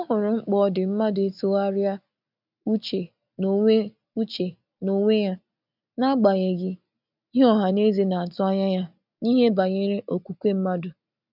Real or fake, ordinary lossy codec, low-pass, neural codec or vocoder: real; none; 5.4 kHz; none